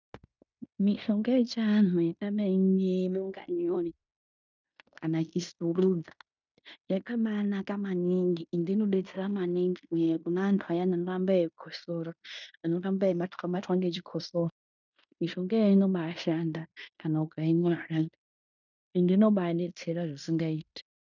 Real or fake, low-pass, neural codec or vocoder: fake; 7.2 kHz; codec, 16 kHz in and 24 kHz out, 0.9 kbps, LongCat-Audio-Codec, fine tuned four codebook decoder